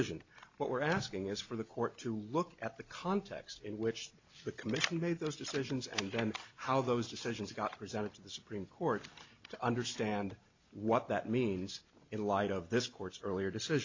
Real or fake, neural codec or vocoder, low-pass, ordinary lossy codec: real; none; 7.2 kHz; MP3, 48 kbps